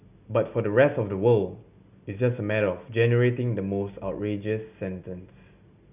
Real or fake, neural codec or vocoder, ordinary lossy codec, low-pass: real; none; none; 3.6 kHz